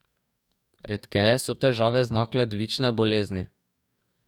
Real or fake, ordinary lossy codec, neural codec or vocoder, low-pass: fake; none; codec, 44.1 kHz, 2.6 kbps, DAC; 19.8 kHz